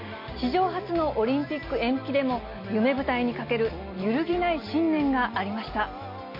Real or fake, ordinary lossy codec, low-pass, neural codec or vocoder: real; MP3, 32 kbps; 5.4 kHz; none